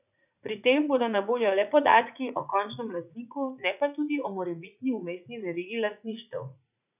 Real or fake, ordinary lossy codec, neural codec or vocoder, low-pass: fake; none; vocoder, 44.1 kHz, 80 mel bands, Vocos; 3.6 kHz